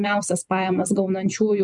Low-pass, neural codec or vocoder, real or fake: 10.8 kHz; vocoder, 44.1 kHz, 128 mel bands every 256 samples, BigVGAN v2; fake